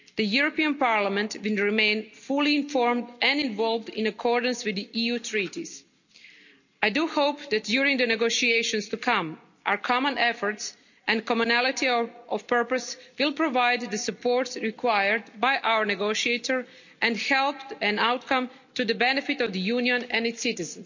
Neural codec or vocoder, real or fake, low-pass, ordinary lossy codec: none; real; 7.2 kHz; none